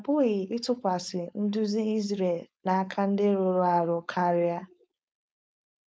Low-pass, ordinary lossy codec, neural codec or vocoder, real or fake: none; none; codec, 16 kHz, 4.8 kbps, FACodec; fake